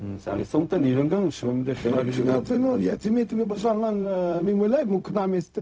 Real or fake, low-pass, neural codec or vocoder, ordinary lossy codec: fake; none; codec, 16 kHz, 0.4 kbps, LongCat-Audio-Codec; none